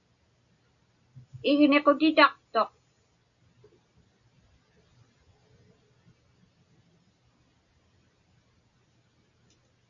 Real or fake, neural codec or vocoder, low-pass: real; none; 7.2 kHz